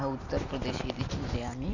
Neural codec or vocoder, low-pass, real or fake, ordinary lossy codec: vocoder, 44.1 kHz, 128 mel bands every 256 samples, BigVGAN v2; 7.2 kHz; fake; none